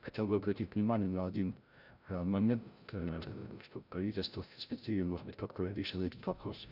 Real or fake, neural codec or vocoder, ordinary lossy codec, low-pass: fake; codec, 16 kHz, 0.5 kbps, FreqCodec, larger model; none; 5.4 kHz